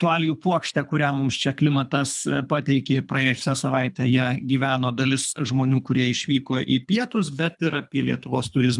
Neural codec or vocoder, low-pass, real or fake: codec, 24 kHz, 3 kbps, HILCodec; 10.8 kHz; fake